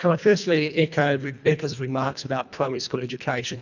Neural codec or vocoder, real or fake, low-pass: codec, 24 kHz, 1.5 kbps, HILCodec; fake; 7.2 kHz